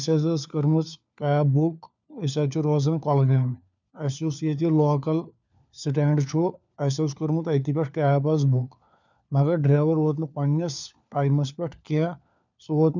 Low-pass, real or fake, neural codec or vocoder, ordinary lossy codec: 7.2 kHz; fake; codec, 16 kHz, 4 kbps, FunCodec, trained on LibriTTS, 50 frames a second; none